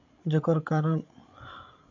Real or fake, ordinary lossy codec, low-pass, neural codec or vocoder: fake; MP3, 48 kbps; 7.2 kHz; codec, 16 kHz, 8 kbps, FreqCodec, larger model